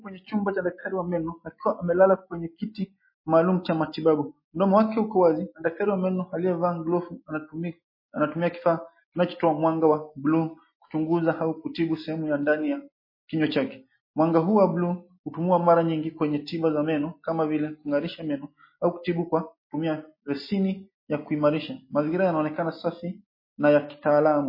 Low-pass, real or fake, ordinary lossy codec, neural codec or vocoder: 5.4 kHz; real; MP3, 24 kbps; none